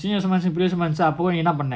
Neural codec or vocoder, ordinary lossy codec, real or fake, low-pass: none; none; real; none